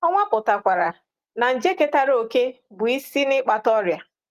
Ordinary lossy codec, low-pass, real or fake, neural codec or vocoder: Opus, 24 kbps; 14.4 kHz; real; none